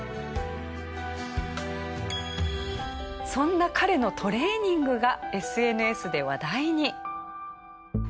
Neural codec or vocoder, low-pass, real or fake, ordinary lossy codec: none; none; real; none